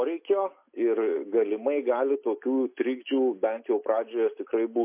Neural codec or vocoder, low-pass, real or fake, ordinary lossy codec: none; 3.6 kHz; real; MP3, 24 kbps